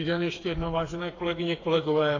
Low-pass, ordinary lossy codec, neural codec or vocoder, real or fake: 7.2 kHz; AAC, 32 kbps; codec, 16 kHz, 4 kbps, FreqCodec, smaller model; fake